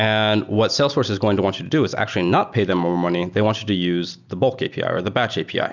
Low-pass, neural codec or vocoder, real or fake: 7.2 kHz; none; real